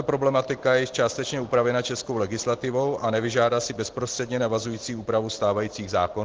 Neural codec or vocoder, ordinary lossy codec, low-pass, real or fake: none; Opus, 16 kbps; 7.2 kHz; real